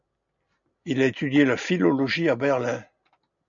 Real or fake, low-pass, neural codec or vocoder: real; 7.2 kHz; none